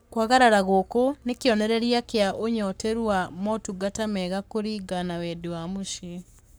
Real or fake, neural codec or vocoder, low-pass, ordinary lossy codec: fake; codec, 44.1 kHz, 7.8 kbps, Pupu-Codec; none; none